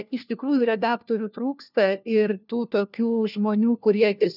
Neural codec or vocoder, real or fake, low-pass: codec, 16 kHz, 1 kbps, FunCodec, trained on LibriTTS, 50 frames a second; fake; 5.4 kHz